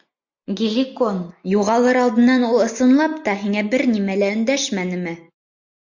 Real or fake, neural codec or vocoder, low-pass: real; none; 7.2 kHz